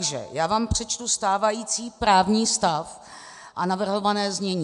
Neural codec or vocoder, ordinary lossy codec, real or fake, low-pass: none; AAC, 96 kbps; real; 10.8 kHz